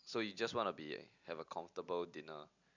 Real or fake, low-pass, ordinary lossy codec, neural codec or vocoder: real; 7.2 kHz; none; none